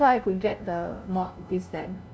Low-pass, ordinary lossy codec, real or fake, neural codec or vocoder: none; none; fake; codec, 16 kHz, 0.5 kbps, FunCodec, trained on LibriTTS, 25 frames a second